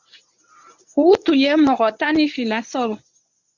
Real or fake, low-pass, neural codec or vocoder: fake; 7.2 kHz; codec, 24 kHz, 0.9 kbps, WavTokenizer, medium speech release version 1